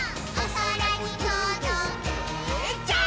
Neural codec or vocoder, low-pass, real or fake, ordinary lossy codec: none; none; real; none